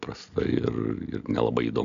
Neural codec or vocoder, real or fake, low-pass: none; real; 7.2 kHz